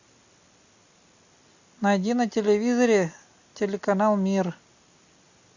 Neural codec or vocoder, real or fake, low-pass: none; real; 7.2 kHz